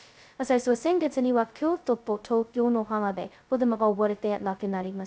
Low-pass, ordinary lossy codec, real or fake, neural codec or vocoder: none; none; fake; codec, 16 kHz, 0.2 kbps, FocalCodec